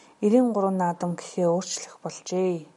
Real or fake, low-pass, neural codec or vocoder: real; 10.8 kHz; none